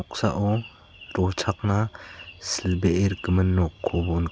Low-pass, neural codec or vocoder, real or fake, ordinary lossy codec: none; none; real; none